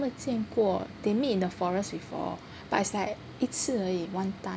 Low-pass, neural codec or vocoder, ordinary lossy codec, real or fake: none; none; none; real